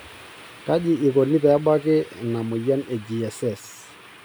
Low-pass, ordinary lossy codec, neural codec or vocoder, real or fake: none; none; none; real